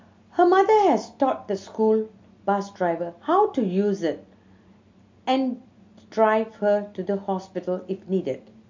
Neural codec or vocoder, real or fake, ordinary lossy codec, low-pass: none; real; MP3, 48 kbps; 7.2 kHz